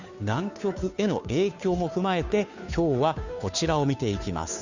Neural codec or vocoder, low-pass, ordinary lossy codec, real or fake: codec, 16 kHz, 2 kbps, FunCodec, trained on Chinese and English, 25 frames a second; 7.2 kHz; none; fake